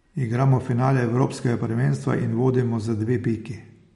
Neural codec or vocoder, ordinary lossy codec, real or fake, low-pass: none; MP3, 48 kbps; real; 19.8 kHz